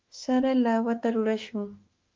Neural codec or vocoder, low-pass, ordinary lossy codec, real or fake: autoencoder, 48 kHz, 32 numbers a frame, DAC-VAE, trained on Japanese speech; 7.2 kHz; Opus, 32 kbps; fake